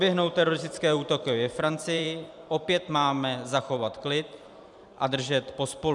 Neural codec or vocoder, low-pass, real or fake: vocoder, 44.1 kHz, 128 mel bands every 512 samples, BigVGAN v2; 10.8 kHz; fake